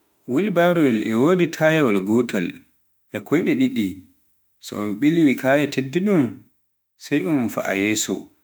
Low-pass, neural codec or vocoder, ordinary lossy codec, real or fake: none; autoencoder, 48 kHz, 32 numbers a frame, DAC-VAE, trained on Japanese speech; none; fake